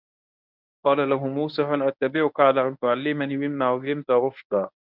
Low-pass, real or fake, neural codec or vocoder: 5.4 kHz; fake; codec, 24 kHz, 0.9 kbps, WavTokenizer, medium speech release version 1